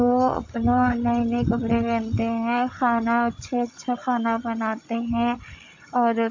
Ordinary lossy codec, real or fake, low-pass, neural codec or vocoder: AAC, 48 kbps; fake; 7.2 kHz; codec, 16 kHz, 16 kbps, FreqCodec, larger model